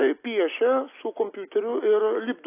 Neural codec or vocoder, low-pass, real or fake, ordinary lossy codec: none; 3.6 kHz; real; AAC, 24 kbps